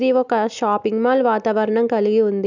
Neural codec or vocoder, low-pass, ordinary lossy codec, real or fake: none; 7.2 kHz; none; real